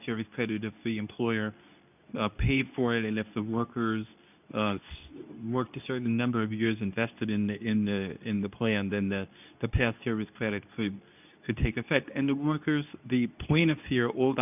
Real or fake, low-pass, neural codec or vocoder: fake; 3.6 kHz; codec, 24 kHz, 0.9 kbps, WavTokenizer, medium speech release version 2